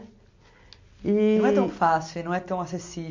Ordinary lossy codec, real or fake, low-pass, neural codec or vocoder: MP3, 64 kbps; real; 7.2 kHz; none